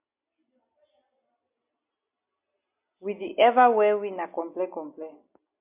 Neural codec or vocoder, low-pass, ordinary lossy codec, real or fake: none; 3.6 kHz; MP3, 32 kbps; real